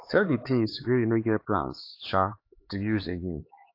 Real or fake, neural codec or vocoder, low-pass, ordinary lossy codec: fake; codec, 16 kHz, 4 kbps, X-Codec, HuBERT features, trained on LibriSpeech; 5.4 kHz; AAC, 32 kbps